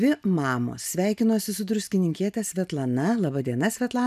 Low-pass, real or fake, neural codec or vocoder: 14.4 kHz; real; none